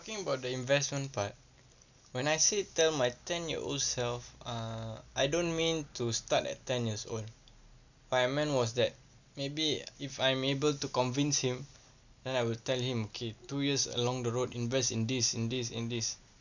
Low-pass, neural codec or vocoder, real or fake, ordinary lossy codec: 7.2 kHz; none; real; none